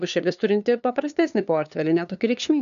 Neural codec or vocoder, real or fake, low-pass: codec, 16 kHz, 2 kbps, FunCodec, trained on LibriTTS, 25 frames a second; fake; 7.2 kHz